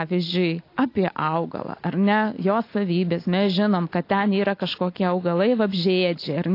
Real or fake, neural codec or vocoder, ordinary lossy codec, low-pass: real; none; AAC, 32 kbps; 5.4 kHz